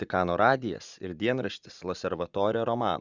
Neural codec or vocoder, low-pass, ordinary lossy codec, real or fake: vocoder, 44.1 kHz, 80 mel bands, Vocos; 7.2 kHz; Opus, 64 kbps; fake